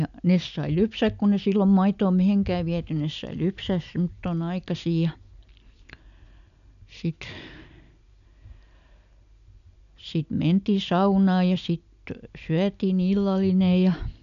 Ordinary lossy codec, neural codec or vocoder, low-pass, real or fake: none; none; 7.2 kHz; real